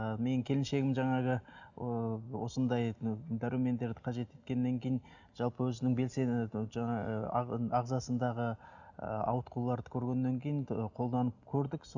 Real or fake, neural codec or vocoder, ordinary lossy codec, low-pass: real; none; none; 7.2 kHz